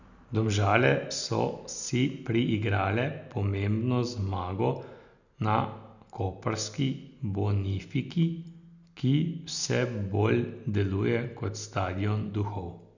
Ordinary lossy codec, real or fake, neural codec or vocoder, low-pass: none; real; none; 7.2 kHz